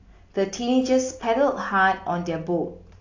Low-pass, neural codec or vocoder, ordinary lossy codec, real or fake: 7.2 kHz; none; AAC, 48 kbps; real